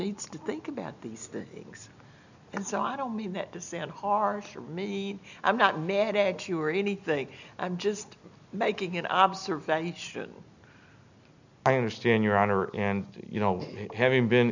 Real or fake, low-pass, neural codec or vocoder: real; 7.2 kHz; none